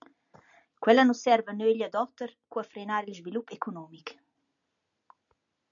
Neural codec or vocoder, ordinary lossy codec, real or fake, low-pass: none; MP3, 96 kbps; real; 7.2 kHz